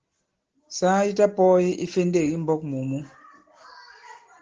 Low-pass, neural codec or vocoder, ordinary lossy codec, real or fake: 7.2 kHz; none; Opus, 16 kbps; real